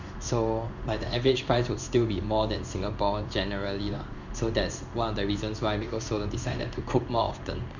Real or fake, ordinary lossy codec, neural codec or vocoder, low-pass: fake; none; codec, 16 kHz in and 24 kHz out, 1 kbps, XY-Tokenizer; 7.2 kHz